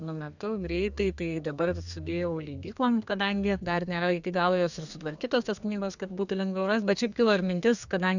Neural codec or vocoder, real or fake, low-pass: codec, 32 kHz, 1.9 kbps, SNAC; fake; 7.2 kHz